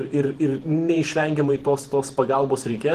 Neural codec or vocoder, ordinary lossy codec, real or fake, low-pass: vocoder, 48 kHz, 128 mel bands, Vocos; Opus, 16 kbps; fake; 14.4 kHz